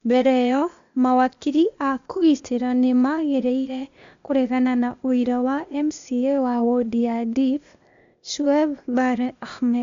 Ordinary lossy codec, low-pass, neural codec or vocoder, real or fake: MP3, 64 kbps; 7.2 kHz; codec, 16 kHz, 0.8 kbps, ZipCodec; fake